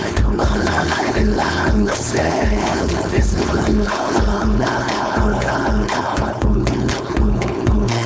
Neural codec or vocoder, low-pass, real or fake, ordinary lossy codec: codec, 16 kHz, 4.8 kbps, FACodec; none; fake; none